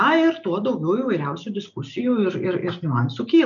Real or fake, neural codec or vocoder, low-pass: real; none; 7.2 kHz